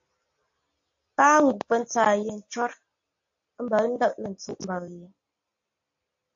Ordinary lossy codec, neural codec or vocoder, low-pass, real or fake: AAC, 64 kbps; none; 7.2 kHz; real